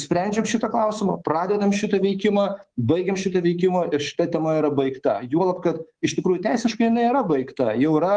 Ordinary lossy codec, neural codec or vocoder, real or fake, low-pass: Opus, 24 kbps; codec, 24 kHz, 3.1 kbps, DualCodec; fake; 9.9 kHz